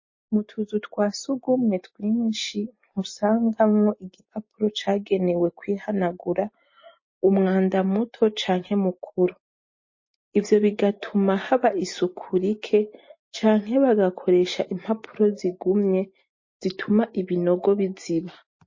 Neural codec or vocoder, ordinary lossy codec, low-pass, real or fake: none; MP3, 32 kbps; 7.2 kHz; real